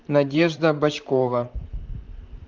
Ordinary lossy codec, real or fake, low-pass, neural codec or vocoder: Opus, 24 kbps; fake; 7.2 kHz; vocoder, 44.1 kHz, 128 mel bands, Pupu-Vocoder